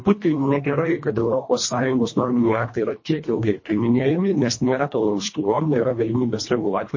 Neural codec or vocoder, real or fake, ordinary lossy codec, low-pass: codec, 24 kHz, 1.5 kbps, HILCodec; fake; MP3, 32 kbps; 7.2 kHz